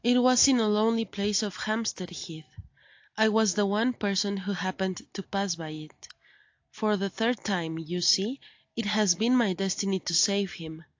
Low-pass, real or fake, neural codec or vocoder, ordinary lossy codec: 7.2 kHz; real; none; AAC, 48 kbps